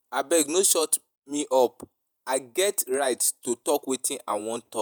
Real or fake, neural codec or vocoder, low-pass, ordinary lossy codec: fake; vocoder, 48 kHz, 128 mel bands, Vocos; none; none